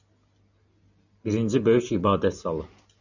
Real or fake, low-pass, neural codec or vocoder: real; 7.2 kHz; none